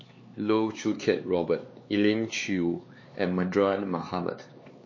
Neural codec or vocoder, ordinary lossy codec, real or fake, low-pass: codec, 16 kHz, 4 kbps, X-Codec, HuBERT features, trained on LibriSpeech; MP3, 32 kbps; fake; 7.2 kHz